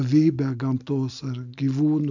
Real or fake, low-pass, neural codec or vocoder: real; 7.2 kHz; none